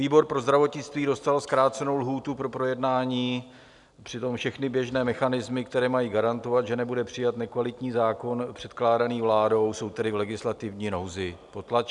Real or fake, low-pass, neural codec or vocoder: real; 10.8 kHz; none